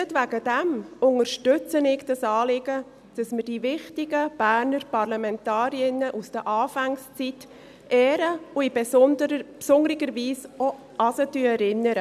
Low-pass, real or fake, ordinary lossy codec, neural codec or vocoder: 14.4 kHz; real; none; none